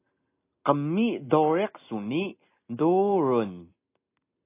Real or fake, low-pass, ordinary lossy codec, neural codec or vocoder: real; 3.6 kHz; AAC, 24 kbps; none